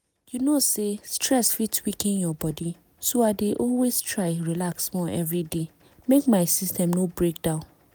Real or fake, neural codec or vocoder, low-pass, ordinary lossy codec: real; none; none; none